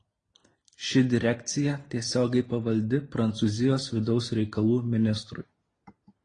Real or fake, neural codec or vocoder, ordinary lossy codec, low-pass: real; none; AAC, 32 kbps; 9.9 kHz